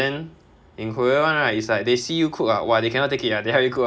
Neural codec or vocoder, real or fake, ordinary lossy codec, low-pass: none; real; none; none